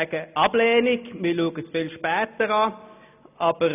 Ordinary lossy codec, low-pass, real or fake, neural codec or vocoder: none; 3.6 kHz; real; none